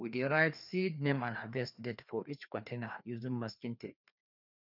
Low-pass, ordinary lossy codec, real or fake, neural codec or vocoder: 5.4 kHz; none; fake; codec, 16 kHz, 2 kbps, FreqCodec, larger model